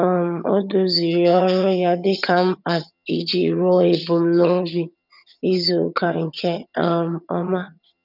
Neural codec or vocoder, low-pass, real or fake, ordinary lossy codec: vocoder, 22.05 kHz, 80 mel bands, HiFi-GAN; 5.4 kHz; fake; none